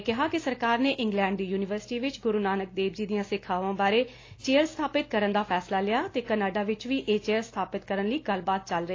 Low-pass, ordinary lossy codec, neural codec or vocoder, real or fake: 7.2 kHz; AAC, 32 kbps; none; real